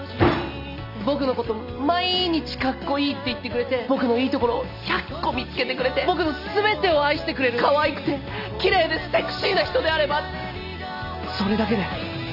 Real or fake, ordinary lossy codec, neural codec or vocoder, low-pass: real; none; none; 5.4 kHz